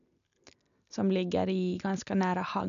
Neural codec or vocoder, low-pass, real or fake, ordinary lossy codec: none; 7.2 kHz; real; none